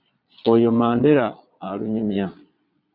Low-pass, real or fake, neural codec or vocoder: 5.4 kHz; fake; vocoder, 22.05 kHz, 80 mel bands, Vocos